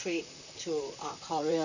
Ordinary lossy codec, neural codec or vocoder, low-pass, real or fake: none; vocoder, 44.1 kHz, 128 mel bands, Pupu-Vocoder; 7.2 kHz; fake